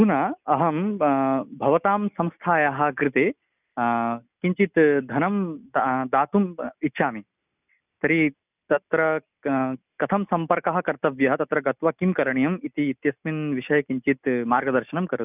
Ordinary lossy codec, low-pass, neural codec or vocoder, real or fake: none; 3.6 kHz; none; real